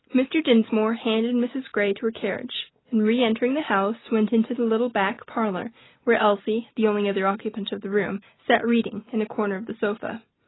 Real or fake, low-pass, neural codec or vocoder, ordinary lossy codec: real; 7.2 kHz; none; AAC, 16 kbps